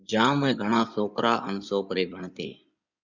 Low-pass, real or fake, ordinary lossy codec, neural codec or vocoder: 7.2 kHz; fake; Opus, 64 kbps; codec, 16 kHz in and 24 kHz out, 2.2 kbps, FireRedTTS-2 codec